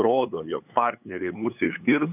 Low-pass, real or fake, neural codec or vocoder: 3.6 kHz; fake; codec, 16 kHz, 8 kbps, FunCodec, trained on LibriTTS, 25 frames a second